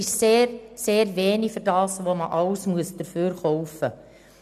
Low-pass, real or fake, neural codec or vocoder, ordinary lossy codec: 14.4 kHz; real; none; none